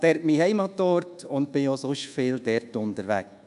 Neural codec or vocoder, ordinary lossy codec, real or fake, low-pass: codec, 24 kHz, 1.2 kbps, DualCodec; AAC, 96 kbps; fake; 10.8 kHz